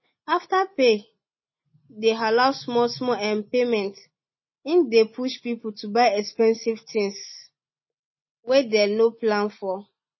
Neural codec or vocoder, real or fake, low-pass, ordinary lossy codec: none; real; 7.2 kHz; MP3, 24 kbps